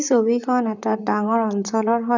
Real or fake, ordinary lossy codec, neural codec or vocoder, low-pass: fake; none; vocoder, 44.1 kHz, 128 mel bands, Pupu-Vocoder; 7.2 kHz